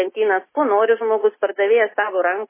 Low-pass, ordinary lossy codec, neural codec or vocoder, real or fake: 3.6 kHz; MP3, 16 kbps; none; real